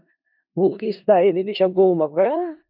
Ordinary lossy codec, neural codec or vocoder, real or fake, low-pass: MP3, 48 kbps; codec, 16 kHz in and 24 kHz out, 0.4 kbps, LongCat-Audio-Codec, four codebook decoder; fake; 5.4 kHz